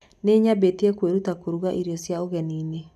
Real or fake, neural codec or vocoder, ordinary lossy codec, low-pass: real; none; none; 14.4 kHz